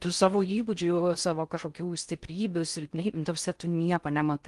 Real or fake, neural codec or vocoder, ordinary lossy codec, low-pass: fake; codec, 16 kHz in and 24 kHz out, 0.6 kbps, FocalCodec, streaming, 4096 codes; Opus, 24 kbps; 10.8 kHz